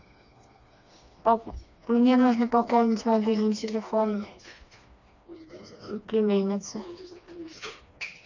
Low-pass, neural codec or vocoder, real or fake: 7.2 kHz; codec, 16 kHz, 2 kbps, FreqCodec, smaller model; fake